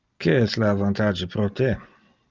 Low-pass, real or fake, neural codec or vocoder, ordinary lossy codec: 7.2 kHz; real; none; Opus, 24 kbps